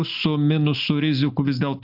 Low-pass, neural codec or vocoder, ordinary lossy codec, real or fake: 5.4 kHz; none; AAC, 48 kbps; real